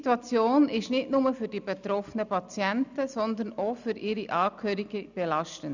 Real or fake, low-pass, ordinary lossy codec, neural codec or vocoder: real; 7.2 kHz; none; none